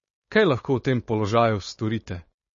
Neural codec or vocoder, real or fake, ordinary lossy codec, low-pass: codec, 16 kHz, 4.8 kbps, FACodec; fake; MP3, 32 kbps; 7.2 kHz